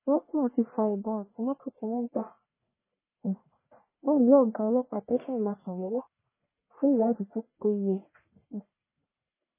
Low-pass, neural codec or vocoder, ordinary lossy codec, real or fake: 3.6 kHz; codec, 44.1 kHz, 1.7 kbps, Pupu-Codec; MP3, 16 kbps; fake